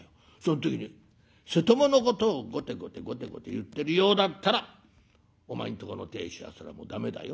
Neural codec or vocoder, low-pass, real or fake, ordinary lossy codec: none; none; real; none